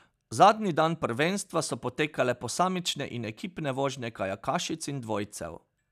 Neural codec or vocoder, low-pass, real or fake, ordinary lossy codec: none; 14.4 kHz; real; none